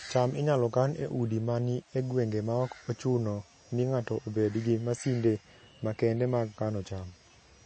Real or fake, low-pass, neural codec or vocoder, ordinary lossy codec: real; 9.9 kHz; none; MP3, 32 kbps